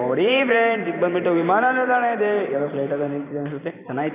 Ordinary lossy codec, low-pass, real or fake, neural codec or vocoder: none; 3.6 kHz; fake; vocoder, 44.1 kHz, 128 mel bands every 512 samples, BigVGAN v2